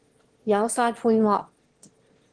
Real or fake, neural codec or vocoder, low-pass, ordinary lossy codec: fake; autoencoder, 22.05 kHz, a latent of 192 numbers a frame, VITS, trained on one speaker; 9.9 kHz; Opus, 16 kbps